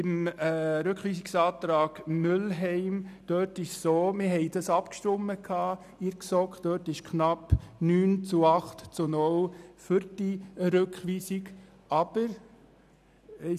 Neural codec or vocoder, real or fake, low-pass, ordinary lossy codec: none; real; 14.4 kHz; none